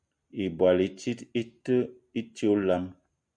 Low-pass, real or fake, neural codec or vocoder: 9.9 kHz; real; none